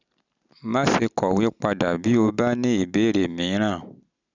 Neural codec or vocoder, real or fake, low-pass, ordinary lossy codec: none; real; 7.2 kHz; none